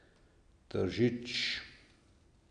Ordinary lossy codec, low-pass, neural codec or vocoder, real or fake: none; 9.9 kHz; none; real